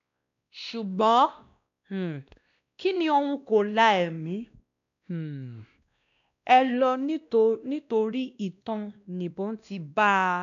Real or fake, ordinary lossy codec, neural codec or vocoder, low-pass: fake; none; codec, 16 kHz, 1 kbps, X-Codec, WavLM features, trained on Multilingual LibriSpeech; 7.2 kHz